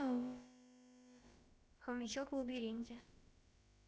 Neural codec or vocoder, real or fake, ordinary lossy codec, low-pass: codec, 16 kHz, about 1 kbps, DyCAST, with the encoder's durations; fake; none; none